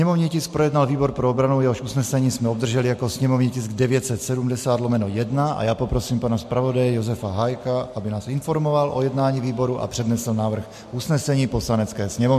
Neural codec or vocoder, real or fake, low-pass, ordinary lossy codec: none; real; 14.4 kHz; MP3, 64 kbps